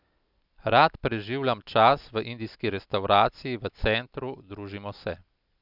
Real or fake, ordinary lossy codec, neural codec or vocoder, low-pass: real; none; none; 5.4 kHz